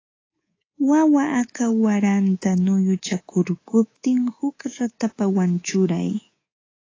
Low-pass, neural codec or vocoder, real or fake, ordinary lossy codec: 7.2 kHz; codec, 24 kHz, 3.1 kbps, DualCodec; fake; AAC, 32 kbps